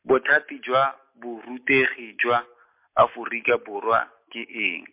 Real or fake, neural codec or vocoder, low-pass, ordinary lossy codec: real; none; 3.6 kHz; MP3, 32 kbps